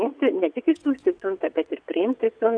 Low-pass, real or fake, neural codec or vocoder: 9.9 kHz; fake; vocoder, 22.05 kHz, 80 mel bands, WaveNeXt